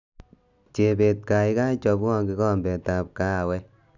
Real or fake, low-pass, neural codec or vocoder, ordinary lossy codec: real; 7.2 kHz; none; none